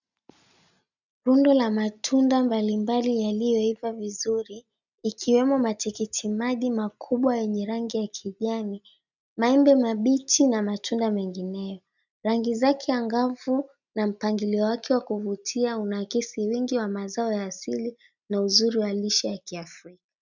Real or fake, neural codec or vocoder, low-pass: real; none; 7.2 kHz